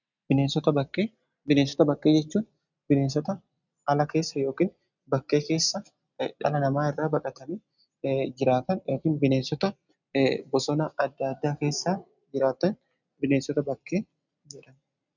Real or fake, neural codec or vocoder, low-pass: real; none; 7.2 kHz